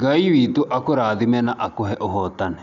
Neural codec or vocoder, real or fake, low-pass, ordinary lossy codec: none; real; 7.2 kHz; none